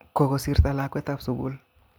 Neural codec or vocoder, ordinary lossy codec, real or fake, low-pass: none; none; real; none